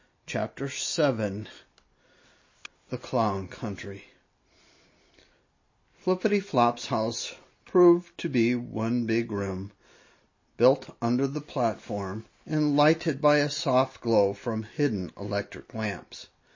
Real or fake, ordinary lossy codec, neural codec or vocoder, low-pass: real; MP3, 32 kbps; none; 7.2 kHz